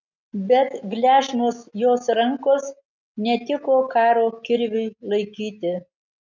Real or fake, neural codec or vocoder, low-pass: real; none; 7.2 kHz